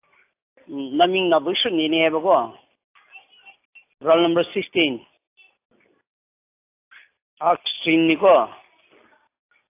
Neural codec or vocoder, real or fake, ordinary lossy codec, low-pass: none; real; AAC, 24 kbps; 3.6 kHz